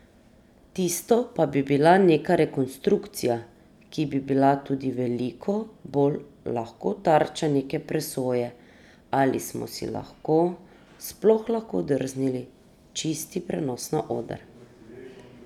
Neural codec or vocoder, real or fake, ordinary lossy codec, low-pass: none; real; none; 19.8 kHz